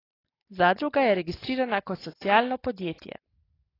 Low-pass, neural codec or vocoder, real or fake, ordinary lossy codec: 5.4 kHz; none; real; AAC, 24 kbps